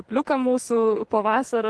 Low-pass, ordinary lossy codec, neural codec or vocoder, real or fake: 10.8 kHz; Opus, 16 kbps; codec, 44.1 kHz, 2.6 kbps, SNAC; fake